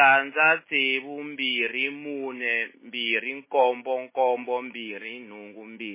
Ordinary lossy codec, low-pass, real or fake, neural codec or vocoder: MP3, 16 kbps; 3.6 kHz; real; none